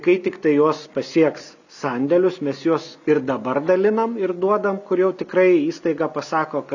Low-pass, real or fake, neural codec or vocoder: 7.2 kHz; real; none